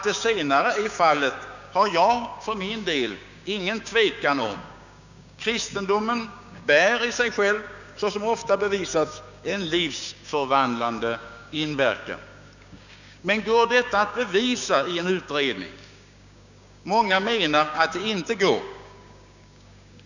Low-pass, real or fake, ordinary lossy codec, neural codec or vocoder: 7.2 kHz; fake; none; codec, 16 kHz, 6 kbps, DAC